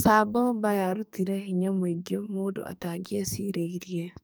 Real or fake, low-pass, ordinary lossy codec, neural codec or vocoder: fake; none; none; codec, 44.1 kHz, 2.6 kbps, SNAC